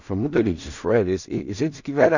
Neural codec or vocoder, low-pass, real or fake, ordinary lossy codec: codec, 16 kHz in and 24 kHz out, 0.4 kbps, LongCat-Audio-Codec, two codebook decoder; 7.2 kHz; fake; none